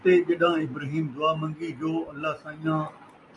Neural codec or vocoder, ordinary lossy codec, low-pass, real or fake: none; AAC, 64 kbps; 10.8 kHz; real